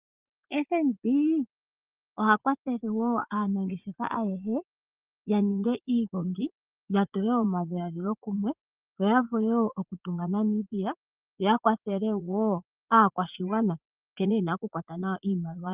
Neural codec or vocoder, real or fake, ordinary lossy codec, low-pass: vocoder, 44.1 kHz, 80 mel bands, Vocos; fake; Opus, 32 kbps; 3.6 kHz